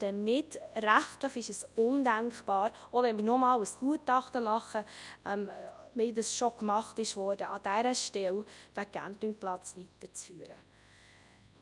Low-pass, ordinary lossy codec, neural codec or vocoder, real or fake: 10.8 kHz; none; codec, 24 kHz, 0.9 kbps, WavTokenizer, large speech release; fake